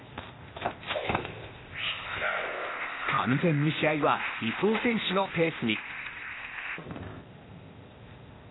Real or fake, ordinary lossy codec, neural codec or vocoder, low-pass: fake; AAC, 16 kbps; codec, 16 kHz, 0.8 kbps, ZipCodec; 7.2 kHz